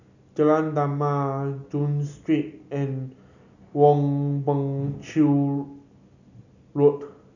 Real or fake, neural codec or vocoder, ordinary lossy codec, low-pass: real; none; none; 7.2 kHz